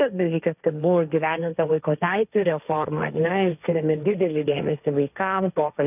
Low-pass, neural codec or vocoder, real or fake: 3.6 kHz; codec, 16 kHz, 1.1 kbps, Voila-Tokenizer; fake